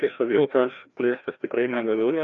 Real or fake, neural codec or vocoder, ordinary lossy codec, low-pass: fake; codec, 16 kHz, 1 kbps, FreqCodec, larger model; MP3, 96 kbps; 7.2 kHz